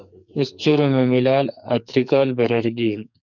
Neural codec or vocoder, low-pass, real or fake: codec, 44.1 kHz, 2.6 kbps, SNAC; 7.2 kHz; fake